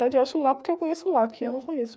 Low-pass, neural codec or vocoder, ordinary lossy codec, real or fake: none; codec, 16 kHz, 2 kbps, FreqCodec, larger model; none; fake